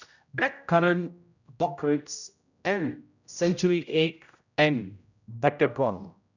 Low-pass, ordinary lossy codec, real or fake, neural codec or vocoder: 7.2 kHz; none; fake; codec, 16 kHz, 0.5 kbps, X-Codec, HuBERT features, trained on general audio